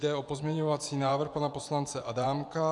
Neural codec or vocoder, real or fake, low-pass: vocoder, 24 kHz, 100 mel bands, Vocos; fake; 10.8 kHz